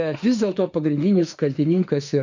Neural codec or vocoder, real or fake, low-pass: autoencoder, 48 kHz, 32 numbers a frame, DAC-VAE, trained on Japanese speech; fake; 7.2 kHz